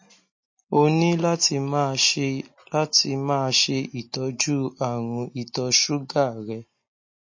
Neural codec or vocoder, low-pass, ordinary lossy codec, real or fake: none; 7.2 kHz; MP3, 32 kbps; real